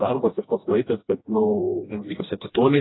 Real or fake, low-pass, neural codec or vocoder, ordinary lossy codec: fake; 7.2 kHz; codec, 16 kHz, 1 kbps, FreqCodec, smaller model; AAC, 16 kbps